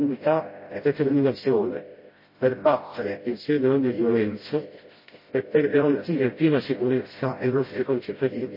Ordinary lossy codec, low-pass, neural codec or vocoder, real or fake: MP3, 24 kbps; 5.4 kHz; codec, 16 kHz, 0.5 kbps, FreqCodec, smaller model; fake